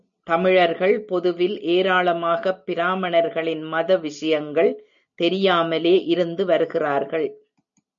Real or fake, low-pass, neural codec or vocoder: real; 7.2 kHz; none